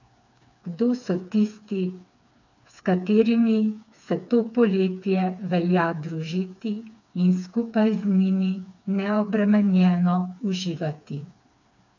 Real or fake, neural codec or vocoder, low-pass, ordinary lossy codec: fake; codec, 16 kHz, 4 kbps, FreqCodec, smaller model; 7.2 kHz; none